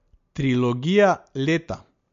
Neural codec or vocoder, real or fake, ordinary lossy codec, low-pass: none; real; MP3, 48 kbps; 7.2 kHz